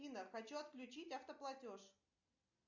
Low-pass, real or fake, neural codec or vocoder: 7.2 kHz; real; none